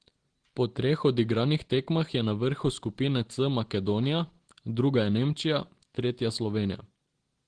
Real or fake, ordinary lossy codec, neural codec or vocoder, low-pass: real; Opus, 16 kbps; none; 9.9 kHz